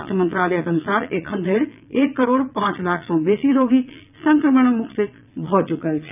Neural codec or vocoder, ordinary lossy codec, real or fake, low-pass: vocoder, 22.05 kHz, 80 mel bands, Vocos; none; fake; 3.6 kHz